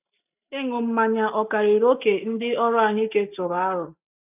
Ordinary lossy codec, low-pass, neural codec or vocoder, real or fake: none; 3.6 kHz; none; real